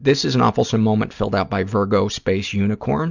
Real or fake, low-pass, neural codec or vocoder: real; 7.2 kHz; none